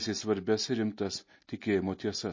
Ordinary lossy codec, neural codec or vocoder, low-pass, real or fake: MP3, 32 kbps; none; 7.2 kHz; real